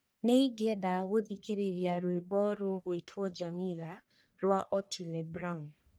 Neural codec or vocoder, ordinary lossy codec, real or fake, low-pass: codec, 44.1 kHz, 1.7 kbps, Pupu-Codec; none; fake; none